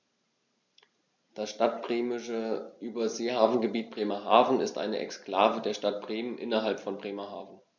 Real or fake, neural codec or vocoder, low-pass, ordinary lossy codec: real; none; 7.2 kHz; none